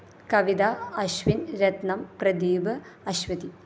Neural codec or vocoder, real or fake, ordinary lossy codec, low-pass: none; real; none; none